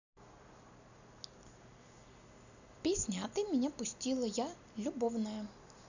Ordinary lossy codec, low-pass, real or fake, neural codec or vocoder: none; 7.2 kHz; real; none